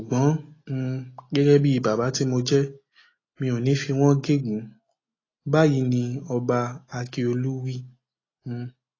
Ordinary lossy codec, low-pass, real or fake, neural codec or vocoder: AAC, 32 kbps; 7.2 kHz; real; none